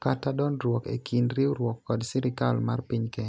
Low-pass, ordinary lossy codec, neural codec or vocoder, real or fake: none; none; none; real